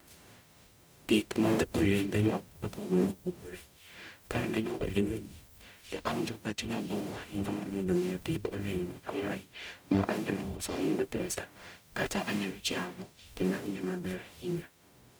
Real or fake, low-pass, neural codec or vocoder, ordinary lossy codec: fake; none; codec, 44.1 kHz, 0.9 kbps, DAC; none